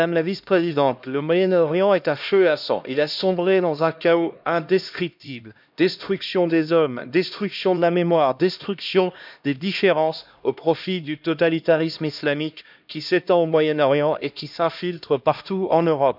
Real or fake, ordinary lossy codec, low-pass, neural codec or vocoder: fake; none; 5.4 kHz; codec, 16 kHz, 1 kbps, X-Codec, HuBERT features, trained on LibriSpeech